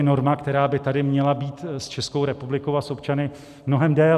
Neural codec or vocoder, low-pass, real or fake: none; 14.4 kHz; real